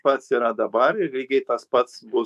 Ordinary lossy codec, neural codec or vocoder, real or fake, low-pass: AAC, 96 kbps; autoencoder, 48 kHz, 128 numbers a frame, DAC-VAE, trained on Japanese speech; fake; 14.4 kHz